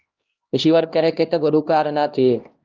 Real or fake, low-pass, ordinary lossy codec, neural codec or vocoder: fake; 7.2 kHz; Opus, 24 kbps; codec, 16 kHz, 1 kbps, X-Codec, HuBERT features, trained on LibriSpeech